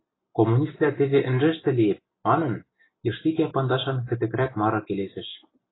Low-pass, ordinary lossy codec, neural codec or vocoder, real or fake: 7.2 kHz; AAC, 16 kbps; none; real